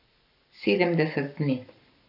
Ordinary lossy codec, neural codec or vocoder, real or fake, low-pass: none; codec, 44.1 kHz, 7.8 kbps, Pupu-Codec; fake; 5.4 kHz